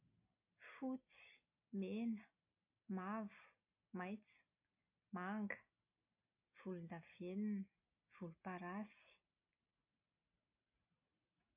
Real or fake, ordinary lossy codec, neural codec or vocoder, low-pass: real; MP3, 24 kbps; none; 3.6 kHz